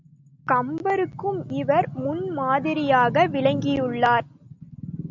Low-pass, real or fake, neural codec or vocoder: 7.2 kHz; real; none